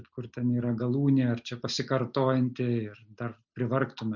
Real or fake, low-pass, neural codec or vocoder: real; 7.2 kHz; none